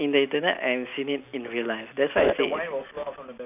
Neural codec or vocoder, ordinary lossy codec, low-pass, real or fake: none; none; 3.6 kHz; real